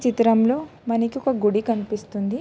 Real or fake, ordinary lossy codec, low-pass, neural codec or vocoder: real; none; none; none